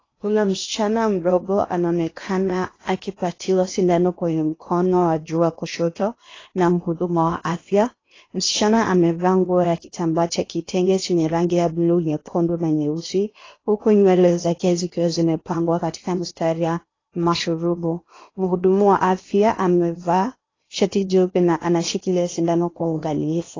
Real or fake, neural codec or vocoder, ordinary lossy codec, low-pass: fake; codec, 16 kHz in and 24 kHz out, 0.8 kbps, FocalCodec, streaming, 65536 codes; AAC, 32 kbps; 7.2 kHz